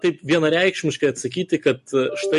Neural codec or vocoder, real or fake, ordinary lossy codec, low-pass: none; real; MP3, 48 kbps; 14.4 kHz